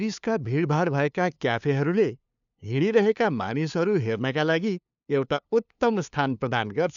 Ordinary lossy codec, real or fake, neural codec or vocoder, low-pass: none; fake; codec, 16 kHz, 2 kbps, FunCodec, trained on LibriTTS, 25 frames a second; 7.2 kHz